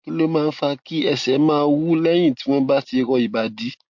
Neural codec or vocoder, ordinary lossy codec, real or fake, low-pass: none; MP3, 48 kbps; real; 7.2 kHz